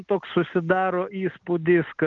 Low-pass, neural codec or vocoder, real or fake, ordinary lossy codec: 7.2 kHz; none; real; Opus, 24 kbps